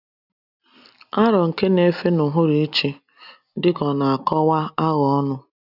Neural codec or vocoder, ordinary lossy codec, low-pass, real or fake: none; none; 5.4 kHz; real